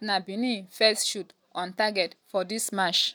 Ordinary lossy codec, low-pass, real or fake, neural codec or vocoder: none; none; real; none